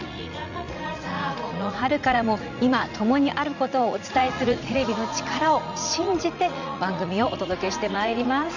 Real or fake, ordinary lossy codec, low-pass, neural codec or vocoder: fake; none; 7.2 kHz; vocoder, 44.1 kHz, 80 mel bands, Vocos